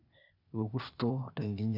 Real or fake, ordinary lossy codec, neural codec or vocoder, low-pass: fake; none; codec, 16 kHz, 0.8 kbps, ZipCodec; 5.4 kHz